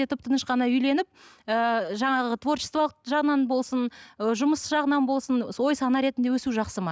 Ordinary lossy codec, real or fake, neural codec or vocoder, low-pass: none; real; none; none